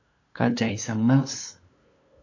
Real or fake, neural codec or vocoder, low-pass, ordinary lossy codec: fake; codec, 16 kHz, 2 kbps, FunCodec, trained on LibriTTS, 25 frames a second; 7.2 kHz; AAC, 48 kbps